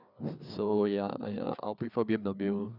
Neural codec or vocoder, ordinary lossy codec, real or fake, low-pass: codec, 16 kHz, 4 kbps, FreqCodec, larger model; none; fake; 5.4 kHz